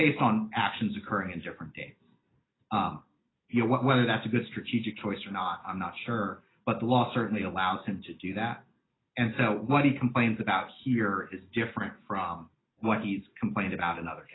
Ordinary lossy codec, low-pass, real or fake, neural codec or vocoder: AAC, 16 kbps; 7.2 kHz; real; none